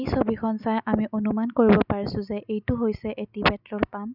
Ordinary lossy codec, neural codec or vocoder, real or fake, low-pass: none; none; real; 5.4 kHz